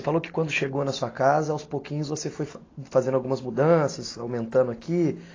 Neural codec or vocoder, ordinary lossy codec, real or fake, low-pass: none; AAC, 32 kbps; real; 7.2 kHz